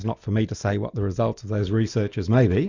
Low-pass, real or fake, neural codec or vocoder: 7.2 kHz; real; none